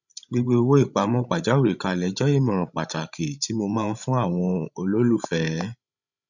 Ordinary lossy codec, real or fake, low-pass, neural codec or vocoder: none; fake; 7.2 kHz; codec, 16 kHz, 16 kbps, FreqCodec, larger model